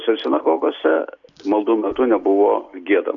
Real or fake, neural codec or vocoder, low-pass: real; none; 7.2 kHz